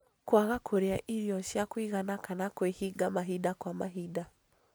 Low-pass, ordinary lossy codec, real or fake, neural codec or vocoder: none; none; real; none